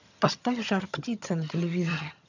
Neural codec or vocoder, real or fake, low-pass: vocoder, 22.05 kHz, 80 mel bands, HiFi-GAN; fake; 7.2 kHz